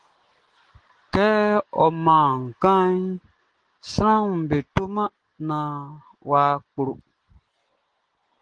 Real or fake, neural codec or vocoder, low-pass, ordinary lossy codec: real; none; 9.9 kHz; Opus, 16 kbps